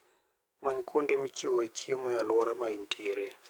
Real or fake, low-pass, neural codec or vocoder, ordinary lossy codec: fake; none; codec, 44.1 kHz, 2.6 kbps, SNAC; none